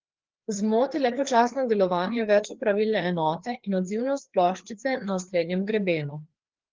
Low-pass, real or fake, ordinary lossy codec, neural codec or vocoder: 7.2 kHz; fake; Opus, 16 kbps; codec, 16 kHz, 2 kbps, FreqCodec, larger model